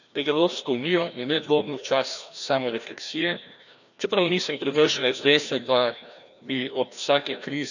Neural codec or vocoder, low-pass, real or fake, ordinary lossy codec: codec, 16 kHz, 1 kbps, FreqCodec, larger model; 7.2 kHz; fake; none